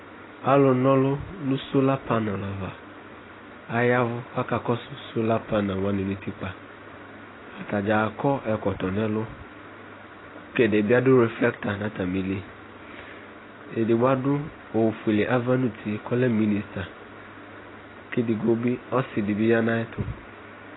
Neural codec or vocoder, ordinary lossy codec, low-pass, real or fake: none; AAC, 16 kbps; 7.2 kHz; real